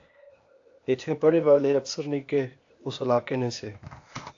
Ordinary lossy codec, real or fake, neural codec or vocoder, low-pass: AAC, 48 kbps; fake; codec, 16 kHz, 0.8 kbps, ZipCodec; 7.2 kHz